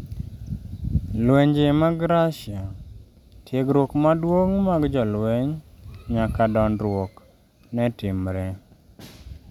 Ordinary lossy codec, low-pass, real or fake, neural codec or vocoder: none; 19.8 kHz; real; none